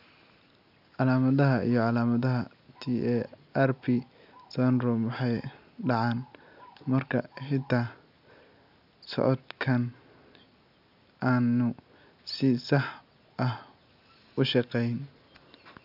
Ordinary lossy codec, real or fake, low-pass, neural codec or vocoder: none; real; 5.4 kHz; none